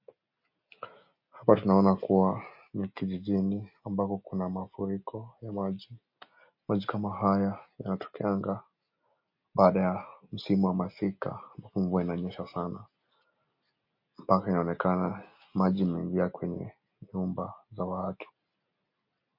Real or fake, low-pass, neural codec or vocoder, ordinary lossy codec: real; 5.4 kHz; none; MP3, 32 kbps